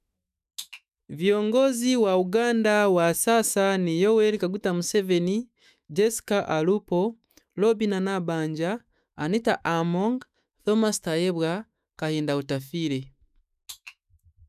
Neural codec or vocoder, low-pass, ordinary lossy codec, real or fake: autoencoder, 48 kHz, 128 numbers a frame, DAC-VAE, trained on Japanese speech; 14.4 kHz; none; fake